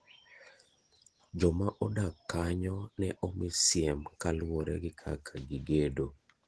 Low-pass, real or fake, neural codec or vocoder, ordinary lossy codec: 10.8 kHz; real; none; Opus, 16 kbps